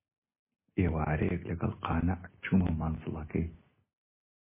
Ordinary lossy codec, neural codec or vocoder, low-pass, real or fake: MP3, 16 kbps; none; 3.6 kHz; real